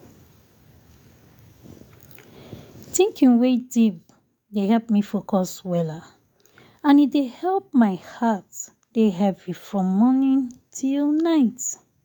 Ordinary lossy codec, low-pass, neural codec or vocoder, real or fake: none; 19.8 kHz; codec, 44.1 kHz, 7.8 kbps, DAC; fake